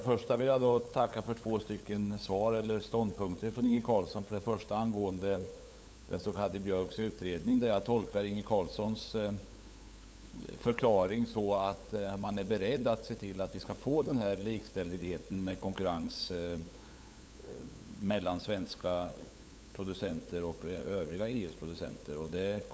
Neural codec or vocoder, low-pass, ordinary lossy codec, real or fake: codec, 16 kHz, 8 kbps, FunCodec, trained on LibriTTS, 25 frames a second; none; none; fake